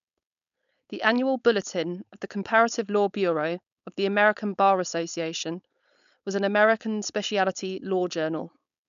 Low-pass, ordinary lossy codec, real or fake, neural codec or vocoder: 7.2 kHz; none; fake; codec, 16 kHz, 4.8 kbps, FACodec